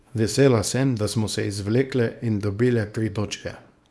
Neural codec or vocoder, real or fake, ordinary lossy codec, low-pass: codec, 24 kHz, 0.9 kbps, WavTokenizer, small release; fake; none; none